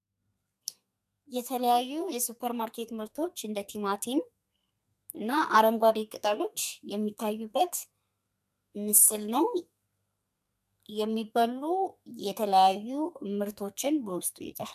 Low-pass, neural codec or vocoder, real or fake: 14.4 kHz; codec, 32 kHz, 1.9 kbps, SNAC; fake